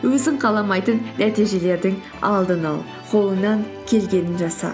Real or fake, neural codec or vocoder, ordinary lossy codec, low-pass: real; none; none; none